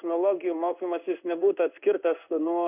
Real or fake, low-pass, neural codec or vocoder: fake; 3.6 kHz; codec, 16 kHz in and 24 kHz out, 1 kbps, XY-Tokenizer